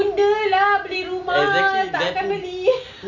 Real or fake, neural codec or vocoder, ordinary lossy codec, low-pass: real; none; none; 7.2 kHz